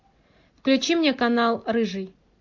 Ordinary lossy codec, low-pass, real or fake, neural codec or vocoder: MP3, 48 kbps; 7.2 kHz; real; none